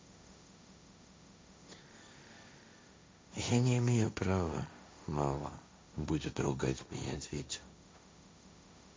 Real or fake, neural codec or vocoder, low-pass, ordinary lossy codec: fake; codec, 16 kHz, 1.1 kbps, Voila-Tokenizer; none; none